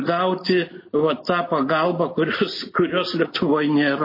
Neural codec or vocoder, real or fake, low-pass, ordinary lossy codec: none; real; 5.4 kHz; MP3, 24 kbps